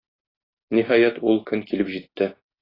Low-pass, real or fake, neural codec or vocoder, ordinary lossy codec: 5.4 kHz; real; none; AAC, 24 kbps